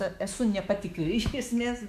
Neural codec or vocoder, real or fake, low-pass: autoencoder, 48 kHz, 128 numbers a frame, DAC-VAE, trained on Japanese speech; fake; 14.4 kHz